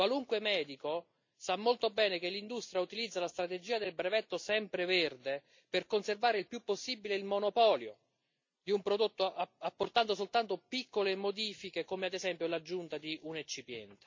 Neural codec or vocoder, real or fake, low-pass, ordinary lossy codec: none; real; 7.2 kHz; MP3, 48 kbps